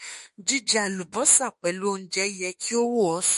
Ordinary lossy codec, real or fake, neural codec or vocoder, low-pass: MP3, 48 kbps; fake; autoencoder, 48 kHz, 32 numbers a frame, DAC-VAE, trained on Japanese speech; 14.4 kHz